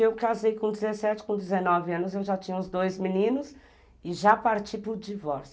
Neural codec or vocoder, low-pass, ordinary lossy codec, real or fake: none; none; none; real